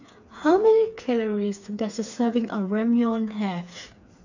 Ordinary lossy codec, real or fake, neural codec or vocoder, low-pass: none; fake; codec, 16 kHz, 4 kbps, FreqCodec, smaller model; 7.2 kHz